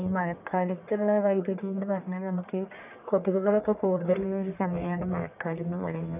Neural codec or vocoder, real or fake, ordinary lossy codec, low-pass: codec, 44.1 kHz, 1.7 kbps, Pupu-Codec; fake; none; 3.6 kHz